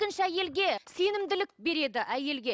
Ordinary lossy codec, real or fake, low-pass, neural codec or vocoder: none; real; none; none